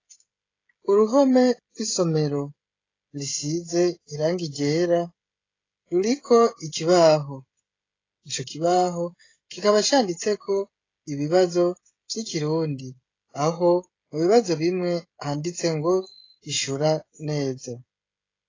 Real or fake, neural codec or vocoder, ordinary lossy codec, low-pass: fake; codec, 16 kHz, 16 kbps, FreqCodec, smaller model; AAC, 32 kbps; 7.2 kHz